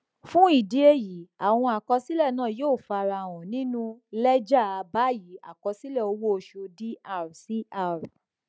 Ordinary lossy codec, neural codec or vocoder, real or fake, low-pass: none; none; real; none